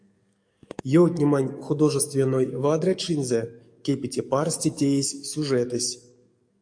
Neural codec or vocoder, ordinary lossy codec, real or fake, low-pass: codec, 44.1 kHz, 7.8 kbps, DAC; AAC, 64 kbps; fake; 9.9 kHz